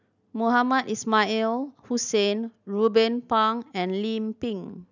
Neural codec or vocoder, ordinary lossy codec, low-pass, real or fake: none; none; 7.2 kHz; real